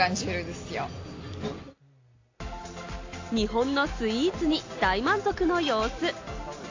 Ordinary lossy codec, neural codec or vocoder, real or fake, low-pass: AAC, 48 kbps; none; real; 7.2 kHz